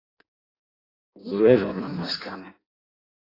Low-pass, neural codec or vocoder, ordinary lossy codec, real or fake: 5.4 kHz; codec, 16 kHz in and 24 kHz out, 0.6 kbps, FireRedTTS-2 codec; AAC, 24 kbps; fake